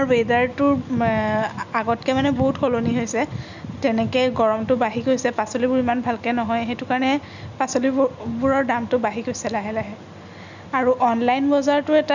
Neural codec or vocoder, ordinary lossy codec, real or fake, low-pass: none; none; real; 7.2 kHz